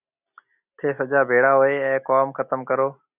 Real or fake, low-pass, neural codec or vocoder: real; 3.6 kHz; none